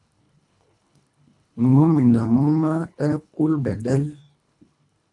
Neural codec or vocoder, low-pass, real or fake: codec, 24 kHz, 1.5 kbps, HILCodec; 10.8 kHz; fake